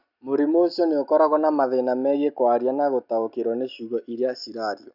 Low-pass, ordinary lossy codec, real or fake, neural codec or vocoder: 5.4 kHz; none; real; none